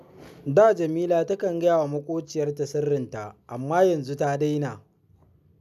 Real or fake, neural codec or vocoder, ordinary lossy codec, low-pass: real; none; none; 14.4 kHz